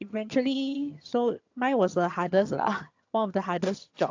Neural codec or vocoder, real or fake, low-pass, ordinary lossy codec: vocoder, 22.05 kHz, 80 mel bands, HiFi-GAN; fake; 7.2 kHz; AAC, 48 kbps